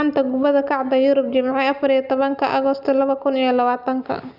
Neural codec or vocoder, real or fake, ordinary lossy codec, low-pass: none; real; none; 5.4 kHz